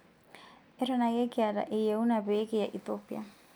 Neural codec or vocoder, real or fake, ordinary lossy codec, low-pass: none; real; none; none